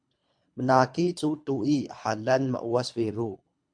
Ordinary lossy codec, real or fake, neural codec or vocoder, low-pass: MP3, 64 kbps; fake; codec, 24 kHz, 3 kbps, HILCodec; 9.9 kHz